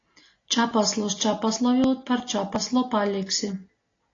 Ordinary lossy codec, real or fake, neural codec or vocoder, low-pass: AAC, 32 kbps; real; none; 7.2 kHz